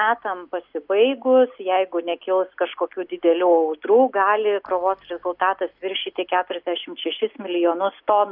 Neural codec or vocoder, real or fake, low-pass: none; real; 5.4 kHz